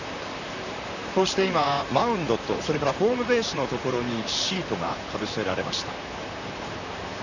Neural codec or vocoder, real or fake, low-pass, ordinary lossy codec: vocoder, 44.1 kHz, 128 mel bands, Pupu-Vocoder; fake; 7.2 kHz; none